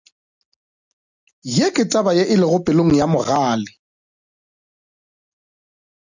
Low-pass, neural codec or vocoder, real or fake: 7.2 kHz; none; real